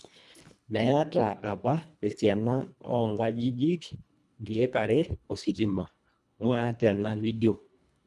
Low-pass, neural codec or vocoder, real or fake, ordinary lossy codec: none; codec, 24 kHz, 1.5 kbps, HILCodec; fake; none